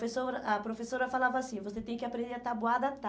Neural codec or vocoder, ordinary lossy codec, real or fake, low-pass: none; none; real; none